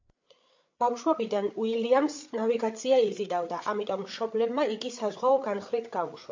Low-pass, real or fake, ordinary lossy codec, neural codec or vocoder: 7.2 kHz; fake; MP3, 48 kbps; codec, 16 kHz, 8 kbps, FreqCodec, larger model